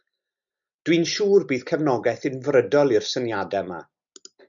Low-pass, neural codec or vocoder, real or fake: 7.2 kHz; none; real